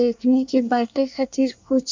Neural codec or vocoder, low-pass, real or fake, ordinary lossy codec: codec, 24 kHz, 1 kbps, SNAC; 7.2 kHz; fake; MP3, 64 kbps